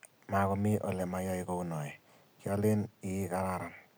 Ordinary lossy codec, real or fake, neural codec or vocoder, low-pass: none; real; none; none